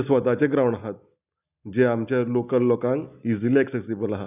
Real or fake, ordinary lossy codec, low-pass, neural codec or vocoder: real; none; 3.6 kHz; none